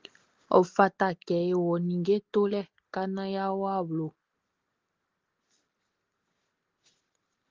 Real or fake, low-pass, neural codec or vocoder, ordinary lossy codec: real; 7.2 kHz; none; Opus, 16 kbps